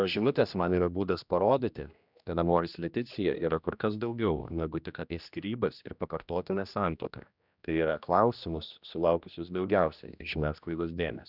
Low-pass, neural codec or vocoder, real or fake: 5.4 kHz; codec, 16 kHz, 1 kbps, X-Codec, HuBERT features, trained on general audio; fake